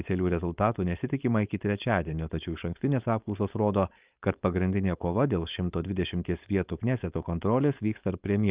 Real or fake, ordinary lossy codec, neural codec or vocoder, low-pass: fake; Opus, 32 kbps; codec, 16 kHz, 4.8 kbps, FACodec; 3.6 kHz